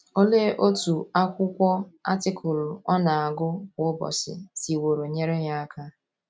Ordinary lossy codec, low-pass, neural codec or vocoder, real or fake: none; none; none; real